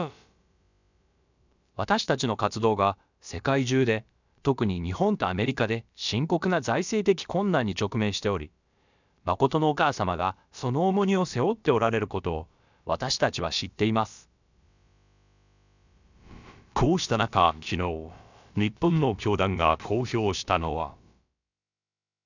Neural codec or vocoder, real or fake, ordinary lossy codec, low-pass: codec, 16 kHz, about 1 kbps, DyCAST, with the encoder's durations; fake; none; 7.2 kHz